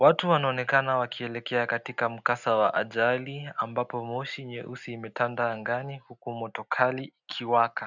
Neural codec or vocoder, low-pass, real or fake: none; 7.2 kHz; real